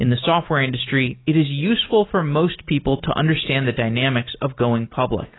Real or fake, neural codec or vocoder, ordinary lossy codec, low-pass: real; none; AAC, 16 kbps; 7.2 kHz